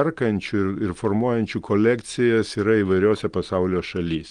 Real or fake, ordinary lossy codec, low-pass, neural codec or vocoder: real; Opus, 24 kbps; 9.9 kHz; none